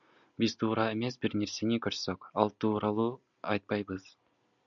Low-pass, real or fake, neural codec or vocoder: 7.2 kHz; real; none